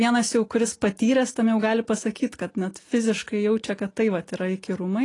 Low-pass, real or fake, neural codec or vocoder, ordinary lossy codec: 10.8 kHz; real; none; AAC, 32 kbps